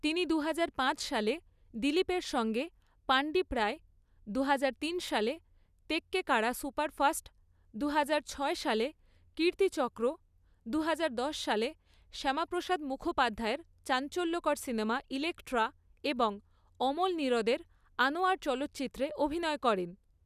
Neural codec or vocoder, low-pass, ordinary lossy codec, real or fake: none; 14.4 kHz; none; real